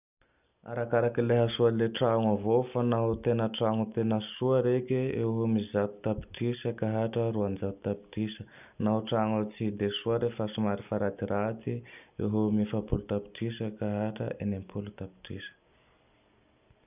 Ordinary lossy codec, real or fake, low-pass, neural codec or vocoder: none; real; 3.6 kHz; none